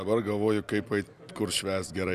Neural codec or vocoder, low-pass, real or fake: none; 14.4 kHz; real